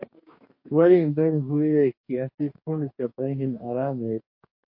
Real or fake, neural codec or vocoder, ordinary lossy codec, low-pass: fake; codec, 44.1 kHz, 2.6 kbps, DAC; MP3, 32 kbps; 5.4 kHz